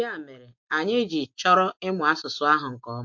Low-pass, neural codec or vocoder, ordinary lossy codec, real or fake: 7.2 kHz; none; MP3, 48 kbps; real